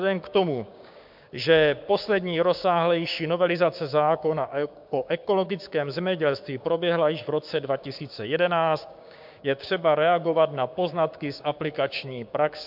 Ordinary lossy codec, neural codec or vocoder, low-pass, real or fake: MP3, 48 kbps; codec, 16 kHz, 6 kbps, DAC; 5.4 kHz; fake